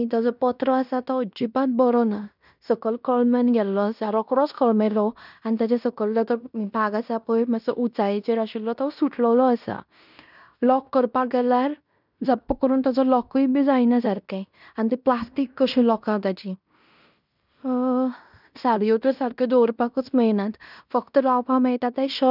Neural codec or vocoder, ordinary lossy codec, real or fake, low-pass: codec, 16 kHz in and 24 kHz out, 0.9 kbps, LongCat-Audio-Codec, fine tuned four codebook decoder; none; fake; 5.4 kHz